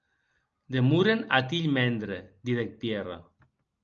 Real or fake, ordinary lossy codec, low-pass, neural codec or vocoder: real; Opus, 24 kbps; 7.2 kHz; none